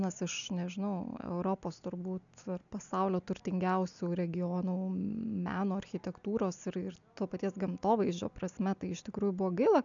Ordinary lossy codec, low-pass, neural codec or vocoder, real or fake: MP3, 96 kbps; 7.2 kHz; none; real